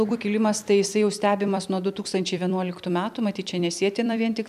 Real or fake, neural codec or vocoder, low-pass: fake; vocoder, 44.1 kHz, 128 mel bands every 256 samples, BigVGAN v2; 14.4 kHz